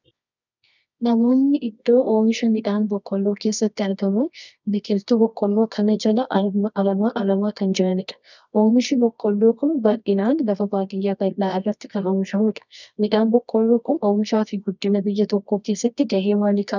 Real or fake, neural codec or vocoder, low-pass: fake; codec, 24 kHz, 0.9 kbps, WavTokenizer, medium music audio release; 7.2 kHz